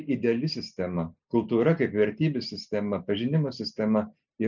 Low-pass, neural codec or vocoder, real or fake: 7.2 kHz; none; real